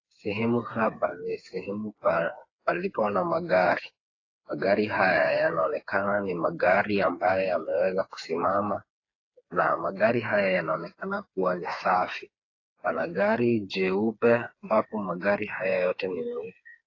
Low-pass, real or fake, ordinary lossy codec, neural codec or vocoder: 7.2 kHz; fake; AAC, 32 kbps; codec, 16 kHz, 4 kbps, FreqCodec, smaller model